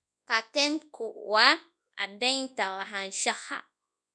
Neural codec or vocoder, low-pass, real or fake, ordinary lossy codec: codec, 24 kHz, 0.9 kbps, WavTokenizer, large speech release; 10.8 kHz; fake; none